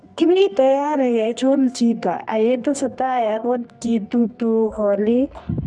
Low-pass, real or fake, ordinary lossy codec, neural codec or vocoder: none; fake; none; codec, 24 kHz, 0.9 kbps, WavTokenizer, medium music audio release